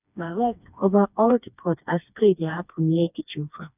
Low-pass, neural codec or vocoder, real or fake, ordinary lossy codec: 3.6 kHz; codec, 16 kHz, 2 kbps, FreqCodec, smaller model; fake; none